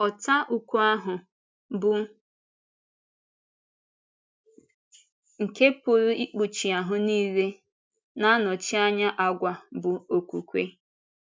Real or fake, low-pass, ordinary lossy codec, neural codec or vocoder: real; none; none; none